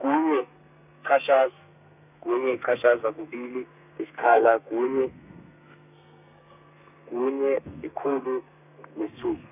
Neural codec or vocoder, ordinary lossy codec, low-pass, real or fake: codec, 32 kHz, 1.9 kbps, SNAC; none; 3.6 kHz; fake